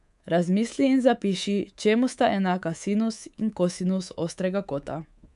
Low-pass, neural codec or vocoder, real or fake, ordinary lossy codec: 10.8 kHz; codec, 24 kHz, 3.1 kbps, DualCodec; fake; none